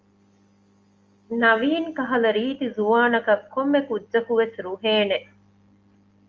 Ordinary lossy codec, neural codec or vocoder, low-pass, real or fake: Opus, 32 kbps; none; 7.2 kHz; real